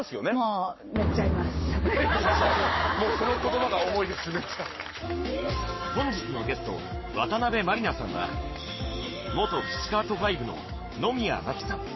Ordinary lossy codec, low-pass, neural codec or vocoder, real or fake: MP3, 24 kbps; 7.2 kHz; codec, 44.1 kHz, 7.8 kbps, Pupu-Codec; fake